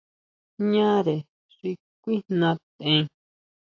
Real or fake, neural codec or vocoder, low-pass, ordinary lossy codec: real; none; 7.2 kHz; AAC, 48 kbps